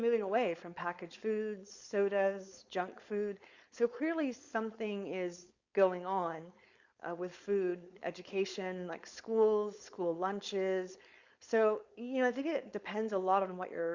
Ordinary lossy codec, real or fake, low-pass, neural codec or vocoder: Opus, 64 kbps; fake; 7.2 kHz; codec, 16 kHz, 4.8 kbps, FACodec